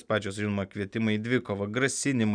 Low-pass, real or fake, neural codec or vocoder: 9.9 kHz; real; none